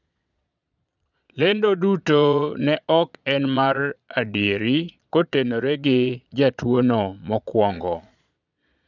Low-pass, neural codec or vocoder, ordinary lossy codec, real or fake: 7.2 kHz; vocoder, 22.05 kHz, 80 mel bands, Vocos; none; fake